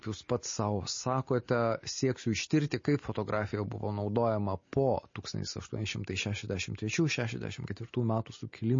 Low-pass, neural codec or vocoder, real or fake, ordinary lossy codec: 7.2 kHz; none; real; MP3, 32 kbps